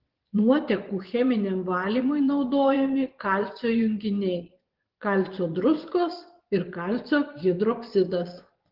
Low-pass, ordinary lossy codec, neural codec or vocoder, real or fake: 5.4 kHz; Opus, 16 kbps; vocoder, 24 kHz, 100 mel bands, Vocos; fake